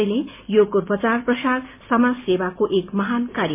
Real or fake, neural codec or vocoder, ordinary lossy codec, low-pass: real; none; MP3, 24 kbps; 3.6 kHz